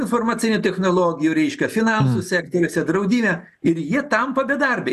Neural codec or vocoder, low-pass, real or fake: none; 14.4 kHz; real